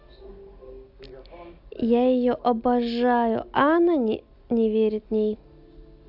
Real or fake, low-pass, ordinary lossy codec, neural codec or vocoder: real; 5.4 kHz; AAC, 48 kbps; none